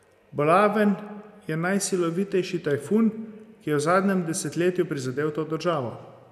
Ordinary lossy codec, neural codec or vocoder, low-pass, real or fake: none; none; 14.4 kHz; real